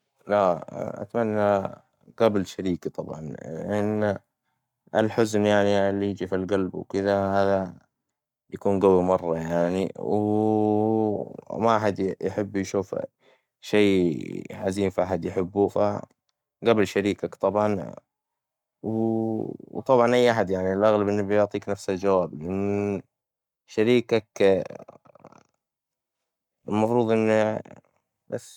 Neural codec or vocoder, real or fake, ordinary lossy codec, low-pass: codec, 44.1 kHz, 7.8 kbps, Pupu-Codec; fake; none; 19.8 kHz